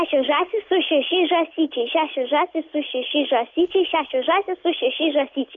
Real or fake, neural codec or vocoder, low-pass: real; none; 7.2 kHz